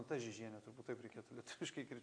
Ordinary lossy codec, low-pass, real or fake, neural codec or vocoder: AAC, 64 kbps; 9.9 kHz; real; none